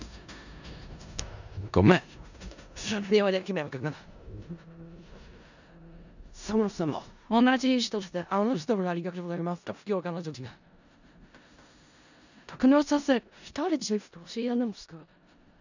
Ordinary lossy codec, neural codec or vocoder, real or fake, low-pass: none; codec, 16 kHz in and 24 kHz out, 0.4 kbps, LongCat-Audio-Codec, four codebook decoder; fake; 7.2 kHz